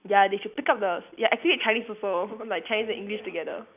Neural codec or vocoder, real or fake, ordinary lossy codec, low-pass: none; real; none; 3.6 kHz